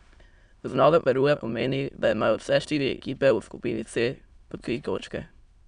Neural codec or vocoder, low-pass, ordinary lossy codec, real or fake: autoencoder, 22.05 kHz, a latent of 192 numbers a frame, VITS, trained on many speakers; 9.9 kHz; none; fake